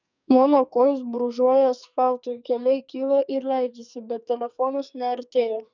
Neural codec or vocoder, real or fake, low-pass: codec, 44.1 kHz, 2.6 kbps, SNAC; fake; 7.2 kHz